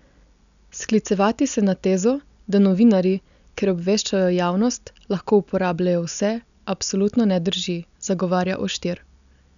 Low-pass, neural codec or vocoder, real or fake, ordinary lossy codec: 7.2 kHz; none; real; none